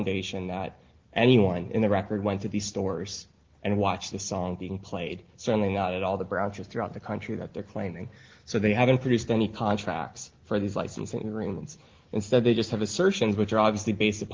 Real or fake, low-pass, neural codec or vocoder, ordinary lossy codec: real; 7.2 kHz; none; Opus, 16 kbps